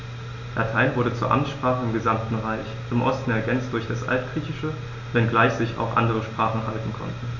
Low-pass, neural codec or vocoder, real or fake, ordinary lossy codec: 7.2 kHz; none; real; none